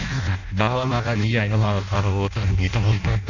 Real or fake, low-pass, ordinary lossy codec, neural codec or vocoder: fake; 7.2 kHz; none; codec, 16 kHz in and 24 kHz out, 0.6 kbps, FireRedTTS-2 codec